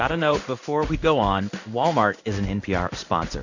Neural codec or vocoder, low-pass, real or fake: codec, 16 kHz in and 24 kHz out, 1 kbps, XY-Tokenizer; 7.2 kHz; fake